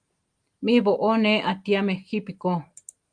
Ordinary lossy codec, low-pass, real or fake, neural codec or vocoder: Opus, 32 kbps; 9.9 kHz; real; none